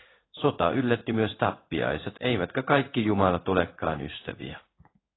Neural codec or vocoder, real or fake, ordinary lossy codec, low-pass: codec, 16 kHz in and 24 kHz out, 1 kbps, XY-Tokenizer; fake; AAC, 16 kbps; 7.2 kHz